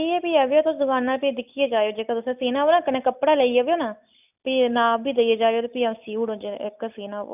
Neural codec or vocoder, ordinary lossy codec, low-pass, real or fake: none; none; 3.6 kHz; real